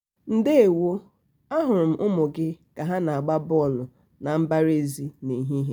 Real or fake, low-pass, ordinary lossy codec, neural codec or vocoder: real; none; none; none